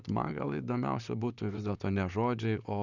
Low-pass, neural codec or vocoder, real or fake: 7.2 kHz; vocoder, 44.1 kHz, 128 mel bands, Pupu-Vocoder; fake